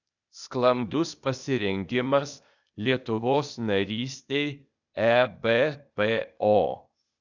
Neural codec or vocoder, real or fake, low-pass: codec, 16 kHz, 0.8 kbps, ZipCodec; fake; 7.2 kHz